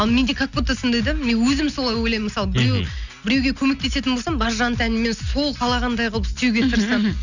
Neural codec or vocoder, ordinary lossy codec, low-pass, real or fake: none; none; 7.2 kHz; real